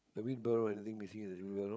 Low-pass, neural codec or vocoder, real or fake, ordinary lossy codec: none; codec, 16 kHz, 8 kbps, FreqCodec, larger model; fake; none